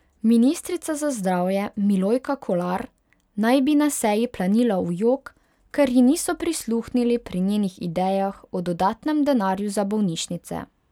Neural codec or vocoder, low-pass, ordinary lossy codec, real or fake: none; 19.8 kHz; none; real